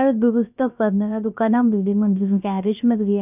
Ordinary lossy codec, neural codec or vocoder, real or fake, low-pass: none; codec, 16 kHz, 0.3 kbps, FocalCodec; fake; 3.6 kHz